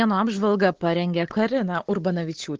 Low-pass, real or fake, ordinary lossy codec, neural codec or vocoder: 7.2 kHz; real; Opus, 16 kbps; none